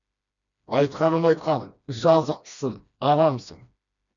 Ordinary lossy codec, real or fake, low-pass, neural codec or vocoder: none; fake; 7.2 kHz; codec, 16 kHz, 1 kbps, FreqCodec, smaller model